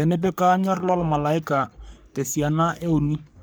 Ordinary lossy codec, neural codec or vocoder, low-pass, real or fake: none; codec, 44.1 kHz, 3.4 kbps, Pupu-Codec; none; fake